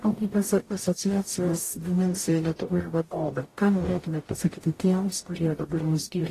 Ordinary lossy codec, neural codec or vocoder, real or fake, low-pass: AAC, 48 kbps; codec, 44.1 kHz, 0.9 kbps, DAC; fake; 14.4 kHz